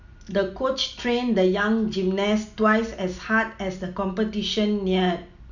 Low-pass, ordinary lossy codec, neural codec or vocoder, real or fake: 7.2 kHz; none; none; real